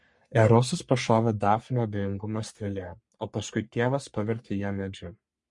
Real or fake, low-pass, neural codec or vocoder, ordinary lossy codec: fake; 10.8 kHz; codec, 44.1 kHz, 3.4 kbps, Pupu-Codec; MP3, 48 kbps